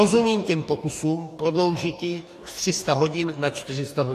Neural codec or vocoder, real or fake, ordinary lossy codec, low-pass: codec, 44.1 kHz, 2.6 kbps, DAC; fake; AAC, 64 kbps; 14.4 kHz